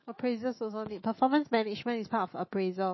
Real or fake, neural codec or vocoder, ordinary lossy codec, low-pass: fake; autoencoder, 48 kHz, 128 numbers a frame, DAC-VAE, trained on Japanese speech; MP3, 24 kbps; 7.2 kHz